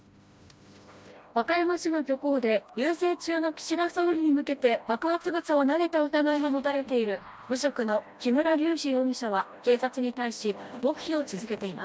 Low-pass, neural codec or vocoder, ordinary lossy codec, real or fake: none; codec, 16 kHz, 1 kbps, FreqCodec, smaller model; none; fake